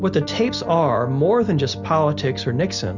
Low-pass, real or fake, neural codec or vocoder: 7.2 kHz; real; none